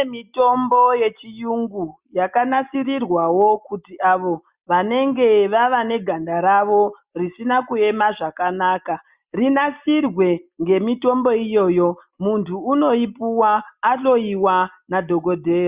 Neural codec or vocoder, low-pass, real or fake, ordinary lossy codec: none; 3.6 kHz; real; Opus, 64 kbps